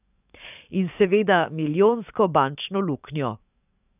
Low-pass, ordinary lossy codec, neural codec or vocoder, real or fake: 3.6 kHz; none; codec, 44.1 kHz, 7.8 kbps, DAC; fake